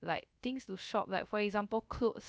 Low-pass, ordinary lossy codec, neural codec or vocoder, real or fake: none; none; codec, 16 kHz, 0.3 kbps, FocalCodec; fake